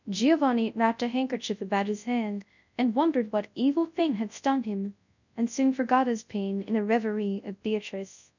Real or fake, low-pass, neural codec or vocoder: fake; 7.2 kHz; codec, 24 kHz, 0.9 kbps, WavTokenizer, large speech release